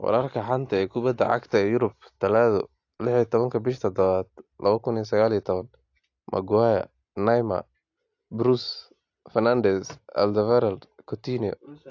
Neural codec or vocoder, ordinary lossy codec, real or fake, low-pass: none; AAC, 48 kbps; real; 7.2 kHz